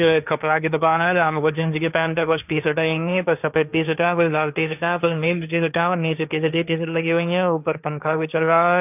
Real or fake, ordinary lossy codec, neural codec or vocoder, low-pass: fake; none; codec, 16 kHz, 1.1 kbps, Voila-Tokenizer; 3.6 kHz